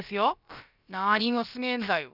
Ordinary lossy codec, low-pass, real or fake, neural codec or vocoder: none; 5.4 kHz; fake; codec, 16 kHz, about 1 kbps, DyCAST, with the encoder's durations